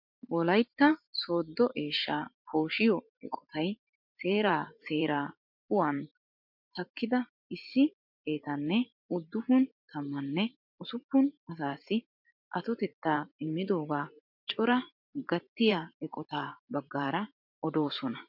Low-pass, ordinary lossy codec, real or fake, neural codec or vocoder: 5.4 kHz; MP3, 48 kbps; fake; vocoder, 44.1 kHz, 128 mel bands every 512 samples, BigVGAN v2